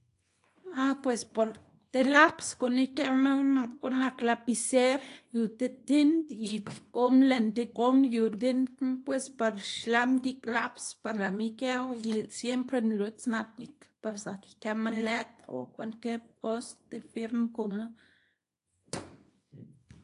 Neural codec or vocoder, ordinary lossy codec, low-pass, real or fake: codec, 24 kHz, 0.9 kbps, WavTokenizer, small release; AAC, 48 kbps; 10.8 kHz; fake